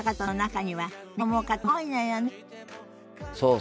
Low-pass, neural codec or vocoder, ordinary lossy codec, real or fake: none; none; none; real